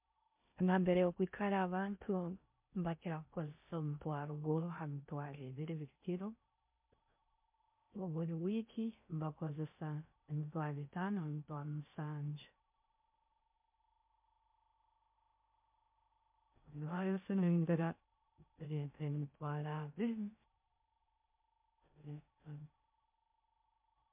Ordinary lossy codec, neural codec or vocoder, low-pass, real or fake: MP3, 32 kbps; codec, 16 kHz in and 24 kHz out, 0.6 kbps, FocalCodec, streaming, 2048 codes; 3.6 kHz; fake